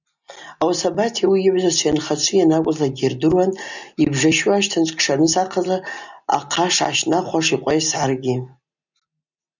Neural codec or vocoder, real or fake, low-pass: none; real; 7.2 kHz